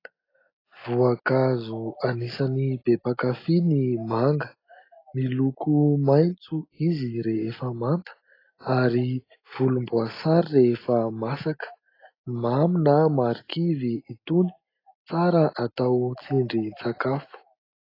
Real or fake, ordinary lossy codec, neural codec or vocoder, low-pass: real; AAC, 24 kbps; none; 5.4 kHz